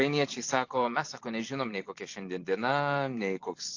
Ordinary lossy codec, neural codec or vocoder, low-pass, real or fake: AAC, 48 kbps; vocoder, 44.1 kHz, 128 mel bands every 512 samples, BigVGAN v2; 7.2 kHz; fake